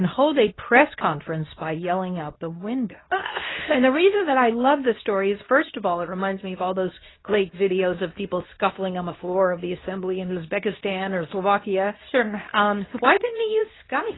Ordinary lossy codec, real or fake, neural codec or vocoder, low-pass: AAC, 16 kbps; fake; codec, 24 kHz, 0.9 kbps, WavTokenizer, medium speech release version 2; 7.2 kHz